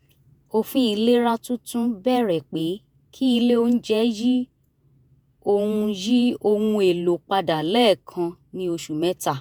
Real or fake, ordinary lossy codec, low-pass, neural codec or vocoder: fake; none; none; vocoder, 48 kHz, 128 mel bands, Vocos